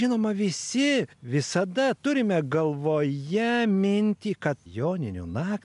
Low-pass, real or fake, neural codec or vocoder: 10.8 kHz; real; none